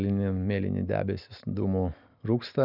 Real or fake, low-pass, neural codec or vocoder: real; 5.4 kHz; none